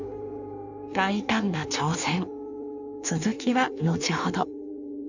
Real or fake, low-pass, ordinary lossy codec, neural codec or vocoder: fake; 7.2 kHz; none; codec, 16 kHz in and 24 kHz out, 1.1 kbps, FireRedTTS-2 codec